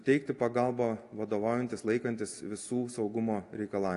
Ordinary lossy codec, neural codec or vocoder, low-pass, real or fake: AAC, 48 kbps; none; 10.8 kHz; real